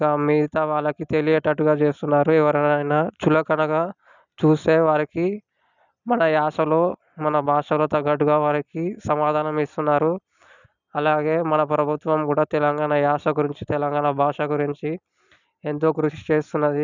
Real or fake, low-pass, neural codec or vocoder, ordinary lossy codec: real; 7.2 kHz; none; none